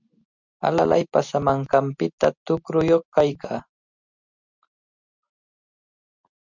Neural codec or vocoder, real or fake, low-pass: none; real; 7.2 kHz